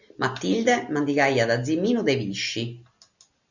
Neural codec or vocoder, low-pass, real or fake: none; 7.2 kHz; real